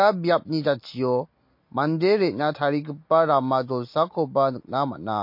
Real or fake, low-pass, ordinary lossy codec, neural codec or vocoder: real; 5.4 kHz; MP3, 32 kbps; none